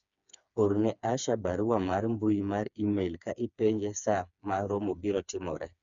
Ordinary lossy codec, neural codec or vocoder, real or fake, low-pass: none; codec, 16 kHz, 4 kbps, FreqCodec, smaller model; fake; 7.2 kHz